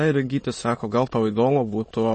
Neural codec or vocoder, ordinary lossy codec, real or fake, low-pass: autoencoder, 22.05 kHz, a latent of 192 numbers a frame, VITS, trained on many speakers; MP3, 32 kbps; fake; 9.9 kHz